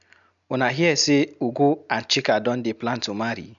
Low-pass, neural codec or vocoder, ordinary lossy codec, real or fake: 7.2 kHz; none; none; real